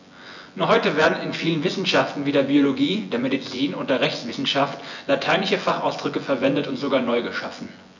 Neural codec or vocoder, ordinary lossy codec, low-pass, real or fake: vocoder, 24 kHz, 100 mel bands, Vocos; none; 7.2 kHz; fake